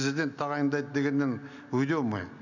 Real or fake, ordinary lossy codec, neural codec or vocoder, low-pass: real; none; none; 7.2 kHz